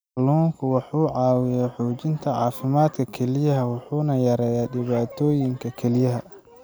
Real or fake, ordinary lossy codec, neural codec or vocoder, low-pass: real; none; none; none